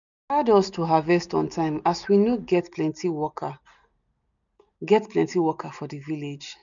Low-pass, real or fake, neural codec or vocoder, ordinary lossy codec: 7.2 kHz; real; none; none